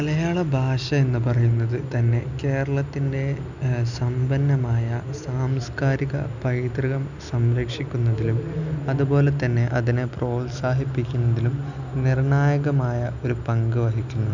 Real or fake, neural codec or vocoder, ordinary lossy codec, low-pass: real; none; none; 7.2 kHz